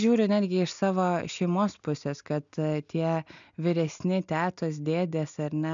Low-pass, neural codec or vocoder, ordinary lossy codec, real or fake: 7.2 kHz; none; MP3, 96 kbps; real